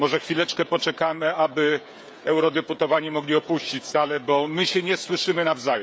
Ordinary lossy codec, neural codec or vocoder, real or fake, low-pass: none; codec, 16 kHz, 16 kbps, FreqCodec, smaller model; fake; none